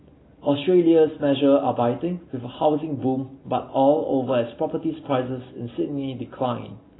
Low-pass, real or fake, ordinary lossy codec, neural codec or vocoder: 7.2 kHz; real; AAC, 16 kbps; none